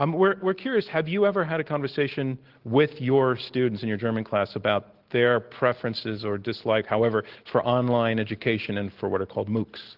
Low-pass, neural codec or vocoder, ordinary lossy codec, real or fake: 5.4 kHz; none; Opus, 16 kbps; real